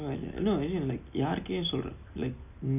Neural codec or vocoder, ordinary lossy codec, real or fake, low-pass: none; none; real; 3.6 kHz